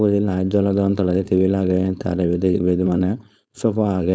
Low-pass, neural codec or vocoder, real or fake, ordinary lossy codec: none; codec, 16 kHz, 4.8 kbps, FACodec; fake; none